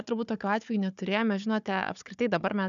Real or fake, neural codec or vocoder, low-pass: fake; codec, 16 kHz, 4 kbps, FunCodec, trained on Chinese and English, 50 frames a second; 7.2 kHz